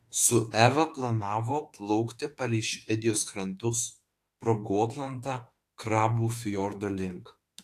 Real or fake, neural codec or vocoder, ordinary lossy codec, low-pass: fake; autoencoder, 48 kHz, 32 numbers a frame, DAC-VAE, trained on Japanese speech; AAC, 64 kbps; 14.4 kHz